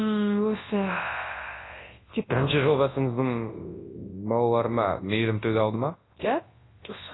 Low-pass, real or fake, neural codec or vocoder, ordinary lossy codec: 7.2 kHz; fake; codec, 24 kHz, 0.9 kbps, WavTokenizer, large speech release; AAC, 16 kbps